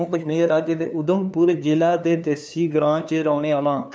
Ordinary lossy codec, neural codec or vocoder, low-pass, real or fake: none; codec, 16 kHz, 2 kbps, FunCodec, trained on LibriTTS, 25 frames a second; none; fake